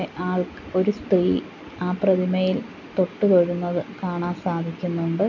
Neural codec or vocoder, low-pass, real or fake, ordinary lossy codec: none; 7.2 kHz; real; MP3, 64 kbps